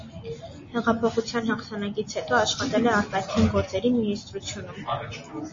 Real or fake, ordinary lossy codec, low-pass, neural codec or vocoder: real; MP3, 32 kbps; 7.2 kHz; none